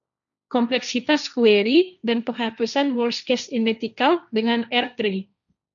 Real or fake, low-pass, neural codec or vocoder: fake; 7.2 kHz; codec, 16 kHz, 1.1 kbps, Voila-Tokenizer